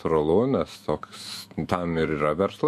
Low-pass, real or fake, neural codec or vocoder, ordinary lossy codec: 14.4 kHz; fake; autoencoder, 48 kHz, 128 numbers a frame, DAC-VAE, trained on Japanese speech; MP3, 64 kbps